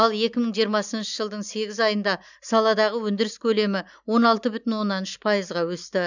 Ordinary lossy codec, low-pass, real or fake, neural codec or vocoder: none; 7.2 kHz; real; none